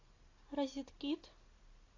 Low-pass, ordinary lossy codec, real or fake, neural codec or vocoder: 7.2 kHz; AAC, 32 kbps; real; none